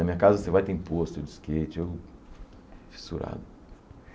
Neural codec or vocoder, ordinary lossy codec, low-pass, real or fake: none; none; none; real